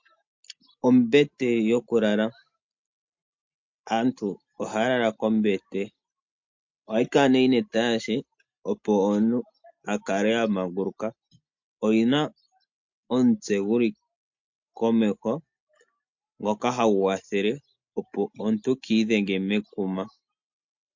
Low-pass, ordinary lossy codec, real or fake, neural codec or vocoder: 7.2 kHz; MP3, 48 kbps; real; none